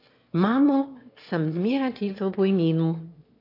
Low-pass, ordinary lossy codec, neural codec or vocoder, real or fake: 5.4 kHz; AAC, 32 kbps; autoencoder, 22.05 kHz, a latent of 192 numbers a frame, VITS, trained on one speaker; fake